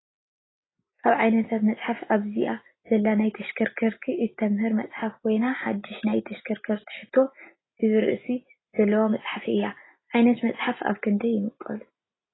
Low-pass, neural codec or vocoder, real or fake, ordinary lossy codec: 7.2 kHz; none; real; AAC, 16 kbps